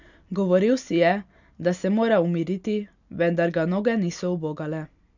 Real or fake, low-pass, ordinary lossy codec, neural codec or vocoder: real; 7.2 kHz; none; none